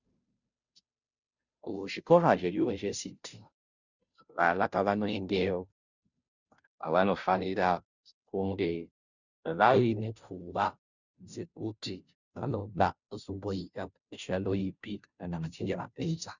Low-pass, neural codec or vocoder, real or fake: 7.2 kHz; codec, 16 kHz, 0.5 kbps, FunCodec, trained on Chinese and English, 25 frames a second; fake